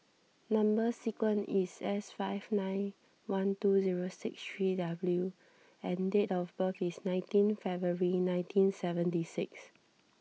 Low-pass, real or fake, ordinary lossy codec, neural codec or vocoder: none; real; none; none